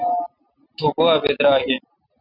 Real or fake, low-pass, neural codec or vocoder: real; 5.4 kHz; none